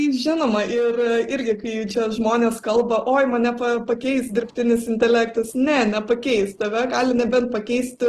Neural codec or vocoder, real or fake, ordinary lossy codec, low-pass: none; real; Opus, 16 kbps; 14.4 kHz